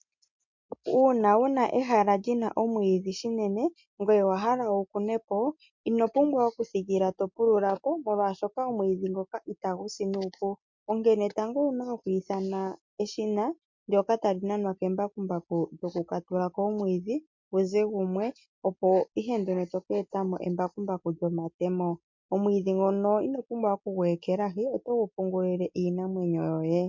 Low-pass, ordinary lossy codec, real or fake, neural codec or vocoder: 7.2 kHz; MP3, 48 kbps; real; none